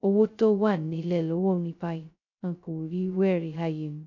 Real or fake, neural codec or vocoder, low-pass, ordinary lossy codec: fake; codec, 16 kHz, 0.2 kbps, FocalCodec; 7.2 kHz; none